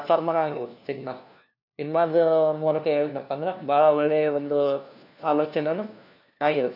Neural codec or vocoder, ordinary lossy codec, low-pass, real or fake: codec, 16 kHz, 1 kbps, FunCodec, trained on LibriTTS, 50 frames a second; none; 5.4 kHz; fake